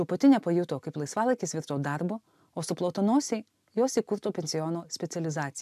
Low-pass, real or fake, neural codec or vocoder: 14.4 kHz; real; none